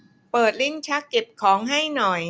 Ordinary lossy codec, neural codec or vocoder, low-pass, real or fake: none; none; none; real